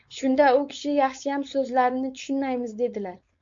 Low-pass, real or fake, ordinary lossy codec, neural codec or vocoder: 7.2 kHz; fake; MP3, 48 kbps; codec, 16 kHz, 4.8 kbps, FACodec